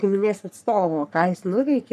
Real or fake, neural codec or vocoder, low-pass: fake; codec, 44.1 kHz, 3.4 kbps, Pupu-Codec; 14.4 kHz